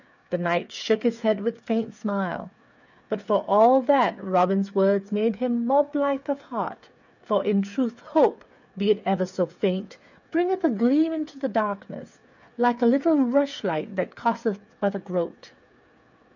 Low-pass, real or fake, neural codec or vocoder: 7.2 kHz; fake; codec, 16 kHz, 8 kbps, FreqCodec, smaller model